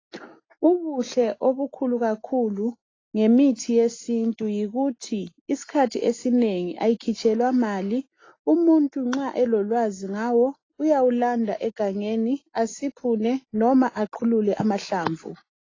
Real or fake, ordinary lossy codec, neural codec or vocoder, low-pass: real; AAC, 32 kbps; none; 7.2 kHz